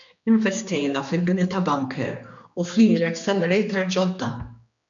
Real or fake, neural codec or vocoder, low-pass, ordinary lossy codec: fake; codec, 16 kHz, 2 kbps, X-Codec, HuBERT features, trained on general audio; 7.2 kHz; MP3, 64 kbps